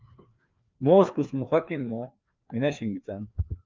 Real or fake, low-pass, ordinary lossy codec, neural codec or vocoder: fake; 7.2 kHz; Opus, 24 kbps; codec, 16 kHz, 2 kbps, FreqCodec, larger model